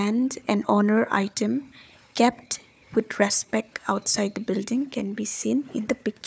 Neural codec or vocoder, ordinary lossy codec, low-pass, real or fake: codec, 16 kHz, 4 kbps, FunCodec, trained on Chinese and English, 50 frames a second; none; none; fake